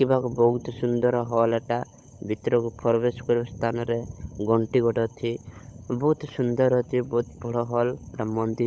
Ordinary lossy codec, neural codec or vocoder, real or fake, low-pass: none; codec, 16 kHz, 16 kbps, FunCodec, trained on LibriTTS, 50 frames a second; fake; none